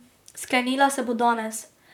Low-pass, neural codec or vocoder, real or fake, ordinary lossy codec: 19.8 kHz; vocoder, 44.1 kHz, 128 mel bands, Pupu-Vocoder; fake; none